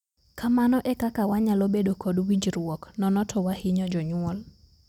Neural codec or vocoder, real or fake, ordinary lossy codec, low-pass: none; real; none; 19.8 kHz